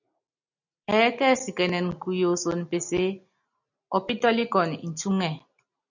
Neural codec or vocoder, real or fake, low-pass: none; real; 7.2 kHz